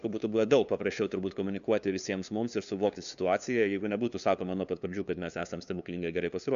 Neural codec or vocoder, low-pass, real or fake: codec, 16 kHz, 2 kbps, FunCodec, trained on LibriTTS, 25 frames a second; 7.2 kHz; fake